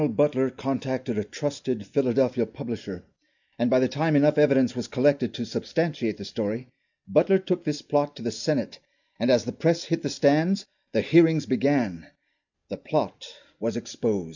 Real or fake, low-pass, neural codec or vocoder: real; 7.2 kHz; none